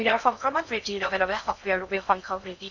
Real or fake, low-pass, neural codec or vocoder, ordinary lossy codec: fake; 7.2 kHz; codec, 16 kHz in and 24 kHz out, 0.6 kbps, FocalCodec, streaming, 4096 codes; none